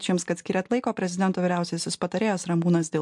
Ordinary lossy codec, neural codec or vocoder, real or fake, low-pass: MP3, 64 kbps; none; real; 10.8 kHz